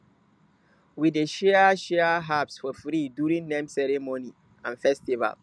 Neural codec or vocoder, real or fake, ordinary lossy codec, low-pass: none; real; none; none